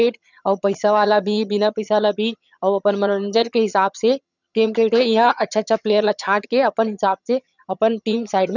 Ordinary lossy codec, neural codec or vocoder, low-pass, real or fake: none; vocoder, 22.05 kHz, 80 mel bands, HiFi-GAN; 7.2 kHz; fake